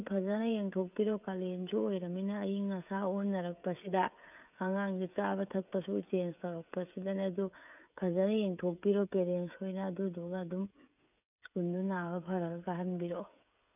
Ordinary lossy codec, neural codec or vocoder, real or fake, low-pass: none; codec, 16 kHz, 16 kbps, FreqCodec, smaller model; fake; 3.6 kHz